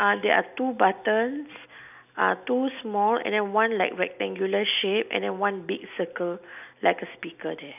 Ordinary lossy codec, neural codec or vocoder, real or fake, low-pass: none; none; real; 3.6 kHz